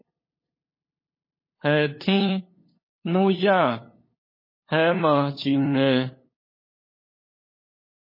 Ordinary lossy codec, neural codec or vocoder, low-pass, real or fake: MP3, 24 kbps; codec, 16 kHz, 2 kbps, FunCodec, trained on LibriTTS, 25 frames a second; 5.4 kHz; fake